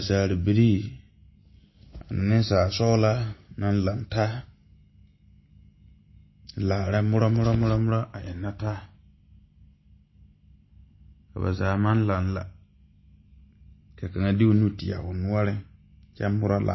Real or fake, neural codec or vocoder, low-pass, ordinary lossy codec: real; none; 7.2 kHz; MP3, 24 kbps